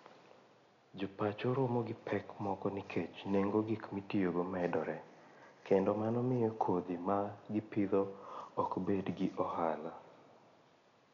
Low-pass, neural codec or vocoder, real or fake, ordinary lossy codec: 7.2 kHz; none; real; none